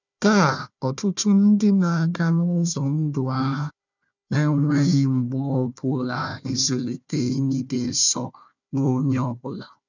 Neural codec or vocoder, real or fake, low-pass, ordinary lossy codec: codec, 16 kHz, 1 kbps, FunCodec, trained on Chinese and English, 50 frames a second; fake; 7.2 kHz; none